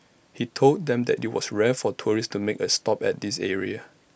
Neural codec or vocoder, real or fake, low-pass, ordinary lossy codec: none; real; none; none